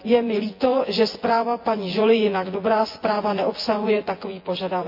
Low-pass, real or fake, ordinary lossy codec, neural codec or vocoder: 5.4 kHz; fake; MP3, 32 kbps; vocoder, 24 kHz, 100 mel bands, Vocos